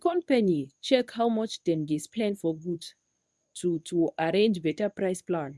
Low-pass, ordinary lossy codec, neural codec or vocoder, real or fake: none; none; codec, 24 kHz, 0.9 kbps, WavTokenizer, medium speech release version 1; fake